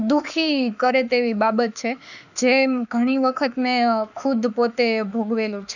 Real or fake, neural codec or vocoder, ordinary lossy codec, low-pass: fake; autoencoder, 48 kHz, 32 numbers a frame, DAC-VAE, trained on Japanese speech; none; 7.2 kHz